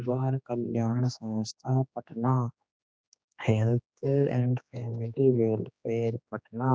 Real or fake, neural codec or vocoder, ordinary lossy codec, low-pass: fake; codec, 16 kHz, 2 kbps, X-Codec, HuBERT features, trained on general audio; none; none